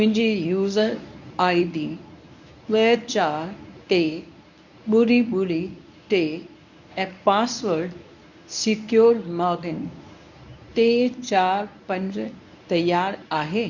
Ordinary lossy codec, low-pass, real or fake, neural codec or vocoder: none; 7.2 kHz; fake; codec, 24 kHz, 0.9 kbps, WavTokenizer, medium speech release version 1